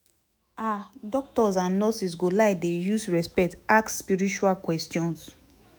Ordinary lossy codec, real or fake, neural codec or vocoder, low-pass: none; fake; autoencoder, 48 kHz, 128 numbers a frame, DAC-VAE, trained on Japanese speech; none